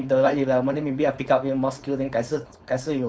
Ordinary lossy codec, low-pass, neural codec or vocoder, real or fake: none; none; codec, 16 kHz, 4.8 kbps, FACodec; fake